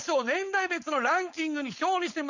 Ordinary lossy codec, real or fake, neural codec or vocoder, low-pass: Opus, 64 kbps; fake; codec, 16 kHz, 4.8 kbps, FACodec; 7.2 kHz